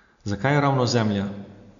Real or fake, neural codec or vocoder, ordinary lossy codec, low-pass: real; none; MP3, 64 kbps; 7.2 kHz